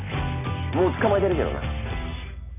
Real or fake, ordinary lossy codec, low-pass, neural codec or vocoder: real; none; 3.6 kHz; none